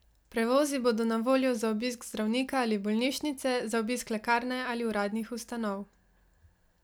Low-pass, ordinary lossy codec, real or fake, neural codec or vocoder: none; none; real; none